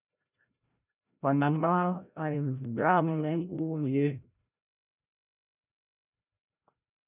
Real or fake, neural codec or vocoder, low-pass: fake; codec, 16 kHz, 0.5 kbps, FreqCodec, larger model; 3.6 kHz